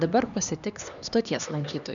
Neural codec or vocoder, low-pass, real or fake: codec, 16 kHz, 2 kbps, X-Codec, HuBERT features, trained on LibriSpeech; 7.2 kHz; fake